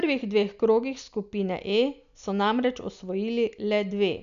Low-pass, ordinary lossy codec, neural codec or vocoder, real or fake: 7.2 kHz; none; none; real